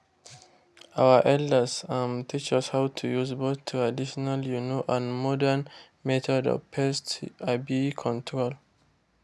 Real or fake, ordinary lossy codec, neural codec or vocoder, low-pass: real; none; none; none